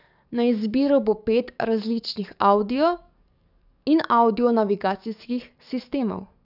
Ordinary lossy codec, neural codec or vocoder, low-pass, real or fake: none; codec, 44.1 kHz, 7.8 kbps, DAC; 5.4 kHz; fake